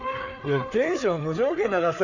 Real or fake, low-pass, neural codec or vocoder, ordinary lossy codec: fake; 7.2 kHz; codec, 16 kHz, 4 kbps, FreqCodec, larger model; none